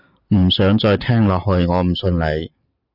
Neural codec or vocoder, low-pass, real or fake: none; 5.4 kHz; real